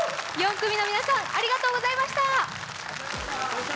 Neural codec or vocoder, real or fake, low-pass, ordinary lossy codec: none; real; none; none